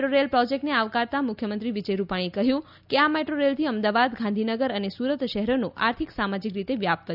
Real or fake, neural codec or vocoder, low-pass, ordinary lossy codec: real; none; 5.4 kHz; none